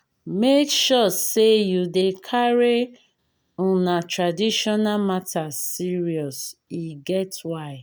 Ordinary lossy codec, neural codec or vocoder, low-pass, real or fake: none; none; none; real